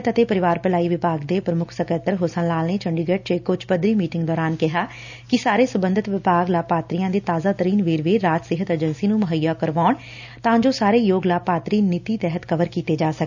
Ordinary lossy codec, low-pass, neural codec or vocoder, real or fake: none; 7.2 kHz; none; real